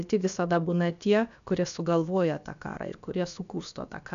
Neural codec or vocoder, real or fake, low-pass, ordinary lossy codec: codec, 16 kHz, about 1 kbps, DyCAST, with the encoder's durations; fake; 7.2 kHz; AAC, 96 kbps